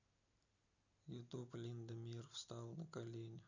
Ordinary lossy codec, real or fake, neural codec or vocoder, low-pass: none; real; none; 7.2 kHz